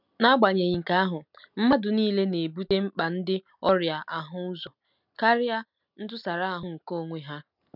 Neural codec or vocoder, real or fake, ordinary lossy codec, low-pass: none; real; none; 5.4 kHz